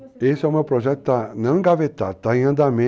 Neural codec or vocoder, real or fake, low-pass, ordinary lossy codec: none; real; none; none